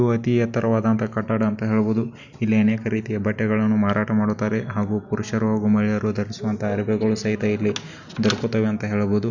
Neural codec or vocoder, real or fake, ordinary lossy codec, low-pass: none; real; none; 7.2 kHz